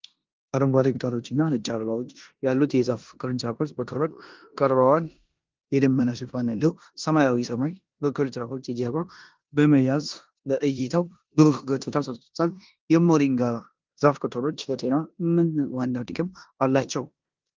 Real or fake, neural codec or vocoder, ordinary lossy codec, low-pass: fake; codec, 16 kHz in and 24 kHz out, 0.9 kbps, LongCat-Audio-Codec, four codebook decoder; Opus, 32 kbps; 7.2 kHz